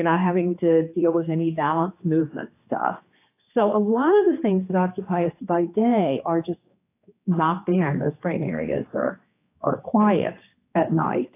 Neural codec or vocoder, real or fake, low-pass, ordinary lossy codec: codec, 16 kHz, 2 kbps, X-Codec, HuBERT features, trained on general audio; fake; 3.6 kHz; AAC, 24 kbps